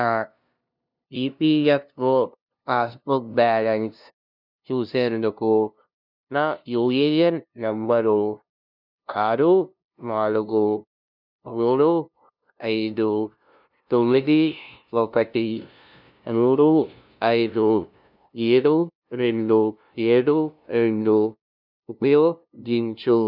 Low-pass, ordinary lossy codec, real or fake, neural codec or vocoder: 5.4 kHz; none; fake; codec, 16 kHz, 0.5 kbps, FunCodec, trained on LibriTTS, 25 frames a second